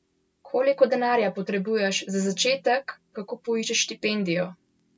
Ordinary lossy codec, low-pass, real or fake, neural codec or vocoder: none; none; real; none